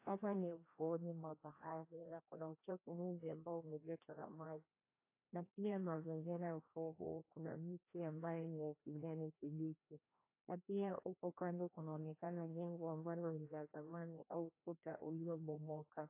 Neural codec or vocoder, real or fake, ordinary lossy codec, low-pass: codec, 16 kHz, 1 kbps, FreqCodec, larger model; fake; AAC, 24 kbps; 3.6 kHz